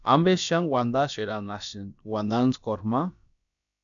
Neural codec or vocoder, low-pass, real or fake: codec, 16 kHz, about 1 kbps, DyCAST, with the encoder's durations; 7.2 kHz; fake